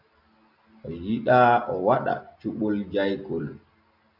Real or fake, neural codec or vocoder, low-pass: real; none; 5.4 kHz